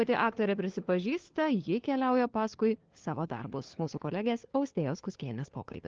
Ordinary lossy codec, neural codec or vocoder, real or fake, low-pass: Opus, 16 kbps; codec, 16 kHz, 4 kbps, FunCodec, trained on LibriTTS, 50 frames a second; fake; 7.2 kHz